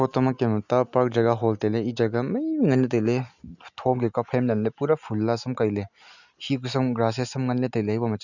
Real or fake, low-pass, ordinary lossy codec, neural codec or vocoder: real; 7.2 kHz; none; none